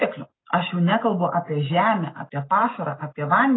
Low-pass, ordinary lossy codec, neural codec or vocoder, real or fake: 7.2 kHz; AAC, 16 kbps; none; real